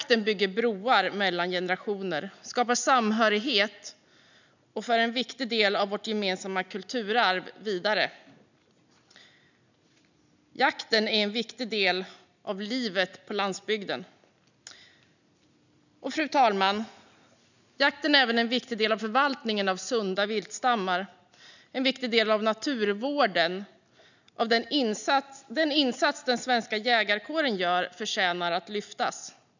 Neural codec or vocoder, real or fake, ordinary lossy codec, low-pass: none; real; none; 7.2 kHz